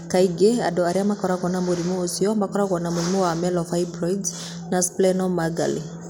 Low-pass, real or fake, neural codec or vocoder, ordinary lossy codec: none; real; none; none